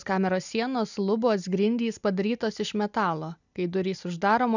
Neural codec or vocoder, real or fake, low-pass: none; real; 7.2 kHz